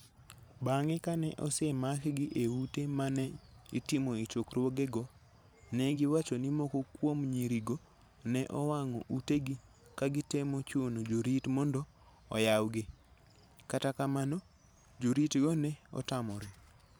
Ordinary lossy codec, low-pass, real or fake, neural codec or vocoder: none; none; fake; vocoder, 44.1 kHz, 128 mel bands every 512 samples, BigVGAN v2